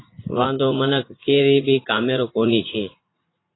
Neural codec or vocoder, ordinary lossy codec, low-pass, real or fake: vocoder, 44.1 kHz, 80 mel bands, Vocos; AAC, 16 kbps; 7.2 kHz; fake